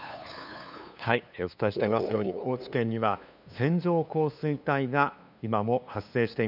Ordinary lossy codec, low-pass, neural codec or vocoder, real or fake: none; 5.4 kHz; codec, 16 kHz, 2 kbps, FunCodec, trained on LibriTTS, 25 frames a second; fake